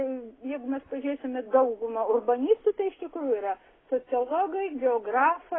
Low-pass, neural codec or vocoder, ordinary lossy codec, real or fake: 7.2 kHz; none; AAC, 16 kbps; real